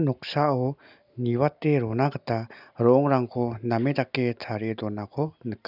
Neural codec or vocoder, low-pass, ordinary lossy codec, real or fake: none; 5.4 kHz; none; real